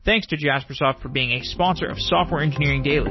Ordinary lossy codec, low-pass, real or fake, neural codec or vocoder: MP3, 24 kbps; 7.2 kHz; real; none